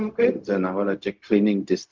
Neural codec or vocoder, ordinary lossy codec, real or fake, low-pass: codec, 16 kHz, 0.4 kbps, LongCat-Audio-Codec; Opus, 32 kbps; fake; 7.2 kHz